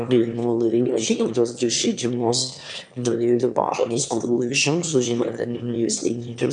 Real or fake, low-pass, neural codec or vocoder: fake; 9.9 kHz; autoencoder, 22.05 kHz, a latent of 192 numbers a frame, VITS, trained on one speaker